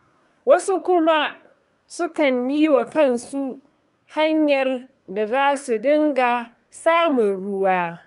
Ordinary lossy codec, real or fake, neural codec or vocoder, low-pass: none; fake; codec, 24 kHz, 1 kbps, SNAC; 10.8 kHz